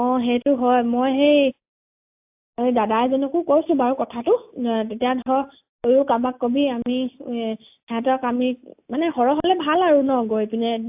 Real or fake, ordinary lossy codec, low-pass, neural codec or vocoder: real; AAC, 32 kbps; 3.6 kHz; none